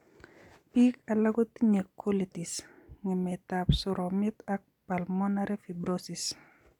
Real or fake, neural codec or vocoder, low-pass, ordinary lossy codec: real; none; 19.8 kHz; none